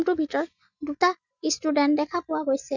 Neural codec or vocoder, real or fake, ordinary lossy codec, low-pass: none; real; none; 7.2 kHz